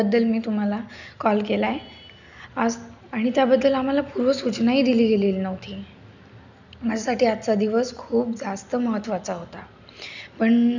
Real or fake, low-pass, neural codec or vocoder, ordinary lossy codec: real; 7.2 kHz; none; none